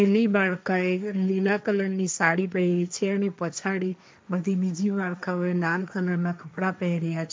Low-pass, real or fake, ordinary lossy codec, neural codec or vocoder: none; fake; none; codec, 16 kHz, 1.1 kbps, Voila-Tokenizer